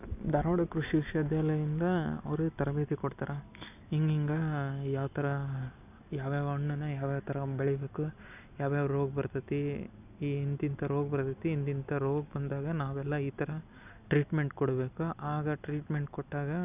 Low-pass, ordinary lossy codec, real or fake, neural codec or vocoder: 3.6 kHz; none; real; none